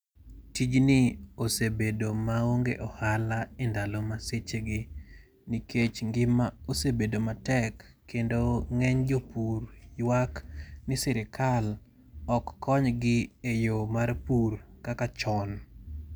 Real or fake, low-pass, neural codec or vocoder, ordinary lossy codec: real; none; none; none